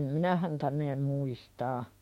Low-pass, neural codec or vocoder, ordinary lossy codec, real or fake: 19.8 kHz; autoencoder, 48 kHz, 32 numbers a frame, DAC-VAE, trained on Japanese speech; MP3, 64 kbps; fake